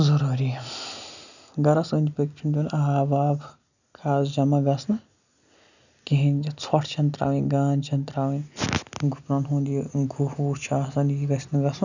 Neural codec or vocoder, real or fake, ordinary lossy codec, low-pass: none; real; none; 7.2 kHz